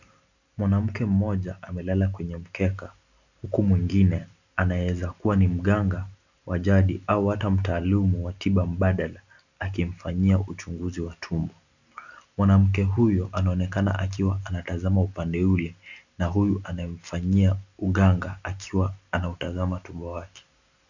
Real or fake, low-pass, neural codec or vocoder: real; 7.2 kHz; none